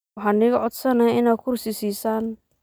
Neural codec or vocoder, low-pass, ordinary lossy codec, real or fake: none; none; none; real